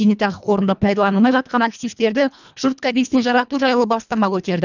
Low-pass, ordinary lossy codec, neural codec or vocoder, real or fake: 7.2 kHz; none; codec, 24 kHz, 1.5 kbps, HILCodec; fake